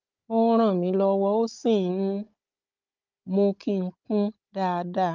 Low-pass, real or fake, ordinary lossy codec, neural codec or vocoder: 7.2 kHz; fake; Opus, 24 kbps; codec, 16 kHz, 16 kbps, FunCodec, trained on Chinese and English, 50 frames a second